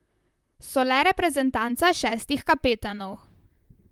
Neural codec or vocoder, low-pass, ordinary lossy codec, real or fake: vocoder, 44.1 kHz, 128 mel bands, Pupu-Vocoder; 19.8 kHz; Opus, 32 kbps; fake